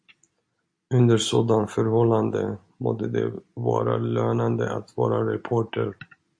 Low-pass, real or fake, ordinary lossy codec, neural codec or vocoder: 9.9 kHz; real; MP3, 48 kbps; none